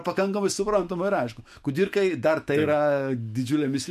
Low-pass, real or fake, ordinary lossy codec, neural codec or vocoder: 14.4 kHz; fake; MP3, 64 kbps; autoencoder, 48 kHz, 128 numbers a frame, DAC-VAE, trained on Japanese speech